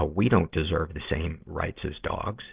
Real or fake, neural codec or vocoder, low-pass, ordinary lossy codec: real; none; 3.6 kHz; Opus, 16 kbps